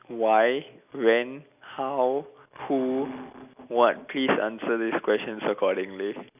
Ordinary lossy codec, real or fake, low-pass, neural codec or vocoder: none; real; 3.6 kHz; none